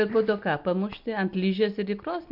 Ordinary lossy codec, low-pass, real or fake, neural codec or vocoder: MP3, 48 kbps; 5.4 kHz; real; none